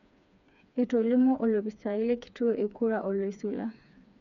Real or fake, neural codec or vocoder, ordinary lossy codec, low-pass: fake; codec, 16 kHz, 4 kbps, FreqCodec, smaller model; none; 7.2 kHz